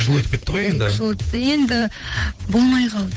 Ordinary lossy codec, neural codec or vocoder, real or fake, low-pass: none; codec, 16 kHz, 8 kbps, FunCodec, trained on Chinese and English, 25 frames a second; fake; none